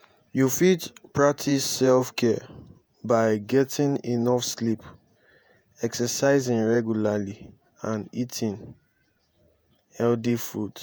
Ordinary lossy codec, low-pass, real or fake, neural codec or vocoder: none; none; fake; vocoder, 48 kHz, 128 mel bands, Vocos